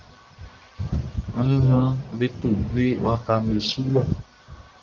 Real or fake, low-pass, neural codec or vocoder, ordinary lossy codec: fake; 7.2 kHz; codec, 44.1 kHz, 1.7 kbps, Pupu-Codec; Opus, 32 kbps